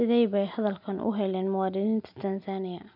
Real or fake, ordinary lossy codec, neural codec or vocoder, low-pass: real; none; none; 5.4 kHz